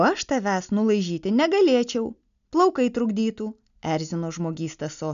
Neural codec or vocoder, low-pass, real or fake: none; 7.2 kHz; real